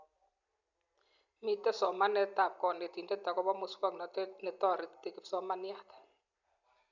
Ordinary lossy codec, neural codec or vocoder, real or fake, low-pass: none; none; real; 7.2 kHz